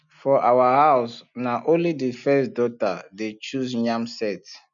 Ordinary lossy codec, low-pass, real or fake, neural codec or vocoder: none; 7.2 kHz; real; none